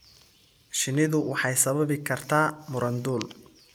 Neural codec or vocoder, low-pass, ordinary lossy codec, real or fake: none; none; none; real